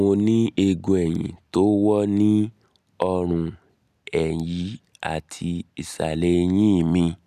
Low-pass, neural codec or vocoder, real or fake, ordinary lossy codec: 14.4 kHz; none; real; none